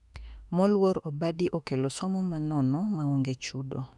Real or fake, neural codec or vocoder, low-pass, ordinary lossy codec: fake; autoencoder, 48 kHz, 32 numbers a frame, DAC-VAE, trained on Japanese speech; 10.8 kHz; none